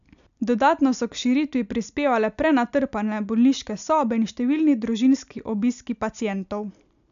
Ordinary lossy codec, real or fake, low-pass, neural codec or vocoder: none; real; 7.2 kHz; none